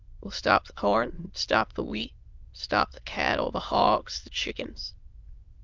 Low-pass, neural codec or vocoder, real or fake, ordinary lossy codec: 7.2 kHz; autoencoder, 22.05 kHz, a latent of 192 numbers a frame, VITS, trained on many speakers; fake; Opus, 24 kbps